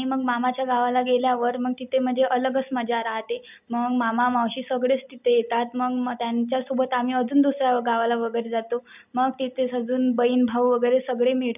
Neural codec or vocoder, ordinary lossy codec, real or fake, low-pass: none; none; real; 3.6 kHz